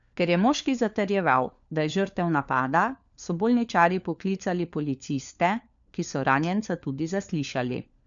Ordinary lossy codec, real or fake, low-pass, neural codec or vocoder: none; fake; 7.2 kHz; codec, 16 kHz, 4 kbps, FunCodec, trained on LibriTTS, 50 frames a second